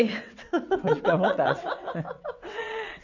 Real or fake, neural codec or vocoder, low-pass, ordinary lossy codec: fake; vocoder, 22.05 kHz, 80 mel bands, WaveNeXt; 7.2 kHz; none